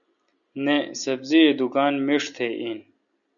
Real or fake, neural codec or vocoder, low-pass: real; none; 7.2 kHz